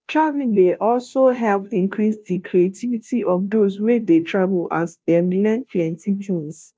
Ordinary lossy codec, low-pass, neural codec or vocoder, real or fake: none; none; codec, 16 kHz, 0.5 kbps, FunCodec, trained on Chinese and English, 25 frames a second; fake